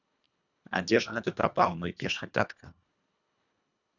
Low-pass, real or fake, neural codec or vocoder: 7.2 kHz; fake; codec, 24 kHz, 1.5 kbps, HILCodec